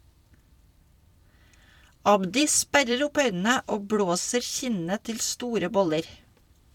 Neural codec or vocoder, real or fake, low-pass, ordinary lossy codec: vocoder, 44.1 kHz, 128 mel bands every 256 samples, BigVGAN v2; fake; 19.8 kHz; MP3, 96 kbps